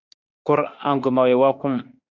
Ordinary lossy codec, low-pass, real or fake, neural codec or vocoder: Opus, 64 kbps; 7.2 kHz; fake; autoencoder, 48 kHz, 32 numbers a frame, DAC-VAE, trained on Japanese speech